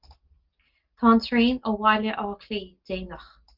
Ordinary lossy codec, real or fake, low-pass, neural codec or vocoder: Opus, 24 kbps; real; 5.4 kHz; none